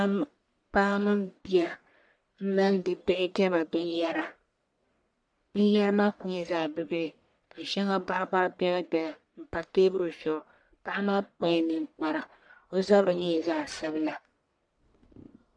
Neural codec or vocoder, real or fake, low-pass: codec, 44.1 kHz, 1.7 kbps, Pupu-Codec; fake; 9.9 kHz